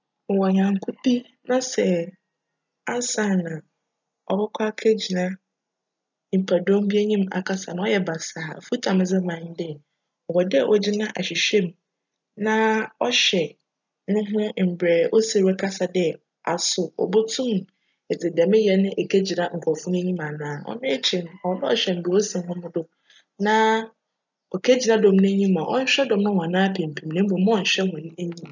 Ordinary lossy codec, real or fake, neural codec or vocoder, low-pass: none; real; none; 7.2 kHz